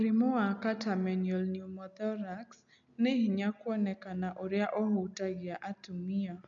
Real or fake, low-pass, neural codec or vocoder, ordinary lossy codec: real; 7.2 kHz; none; none